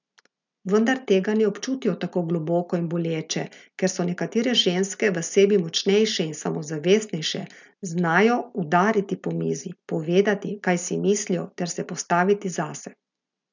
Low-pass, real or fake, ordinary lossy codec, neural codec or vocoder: 7.2 kHz; real; none; none